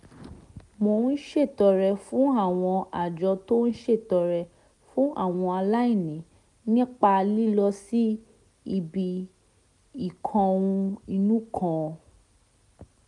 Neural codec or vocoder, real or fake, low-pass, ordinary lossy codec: none; real; 10.8 kHz; none